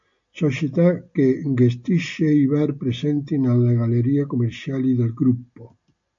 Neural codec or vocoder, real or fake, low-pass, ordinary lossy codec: none; real; 7.2 kHz; MP3, 48 kbps